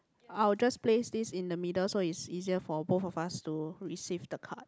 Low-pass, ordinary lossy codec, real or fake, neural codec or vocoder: none; none; real; none